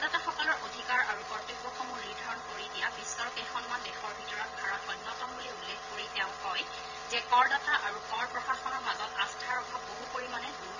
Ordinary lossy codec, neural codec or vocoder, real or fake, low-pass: none; vocoder, 22.05 kHz, 80 mel bands, Vocos; fake; 7.2 kHz